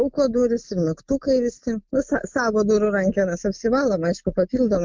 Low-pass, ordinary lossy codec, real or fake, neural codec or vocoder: 7.2 kHz; Opus, 32 kbps; real; none